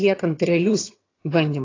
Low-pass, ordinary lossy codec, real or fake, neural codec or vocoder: 7.2 kHz; AAC, 32 kbps; fake; vocoder, 22.05 kHz, 80 mel bands, HiFi-GAN